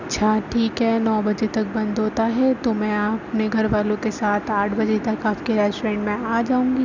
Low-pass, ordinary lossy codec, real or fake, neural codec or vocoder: 7.2 kHz; none; real; none